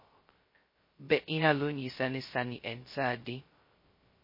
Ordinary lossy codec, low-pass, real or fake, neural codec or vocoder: MP3, 24 kbps; 5.4 kHz; fake; codec, 16 kHz, 0.2 kbps, FocalCodec